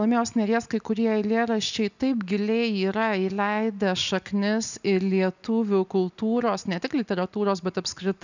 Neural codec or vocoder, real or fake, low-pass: none; real; 7.2 kHz